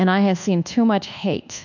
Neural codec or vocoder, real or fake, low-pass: codec, 24 kHz, 1.2 kbps, DualCodec; fake; 7.2 kHz